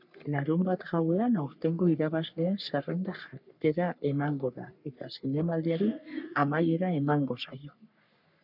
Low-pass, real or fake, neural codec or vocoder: 5.4 kHz; fake; codec, 44.1 kHz, 3.4 kbps, Pupu-Codec